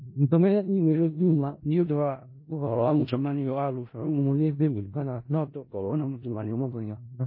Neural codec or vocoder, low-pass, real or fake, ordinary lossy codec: codec, 16 kHz in and 24 kHz out, 0.4 kbps, LongCat-Audio-Codec, four codebook decoder; 5.4 kHz; fake; MP3, 24 kbps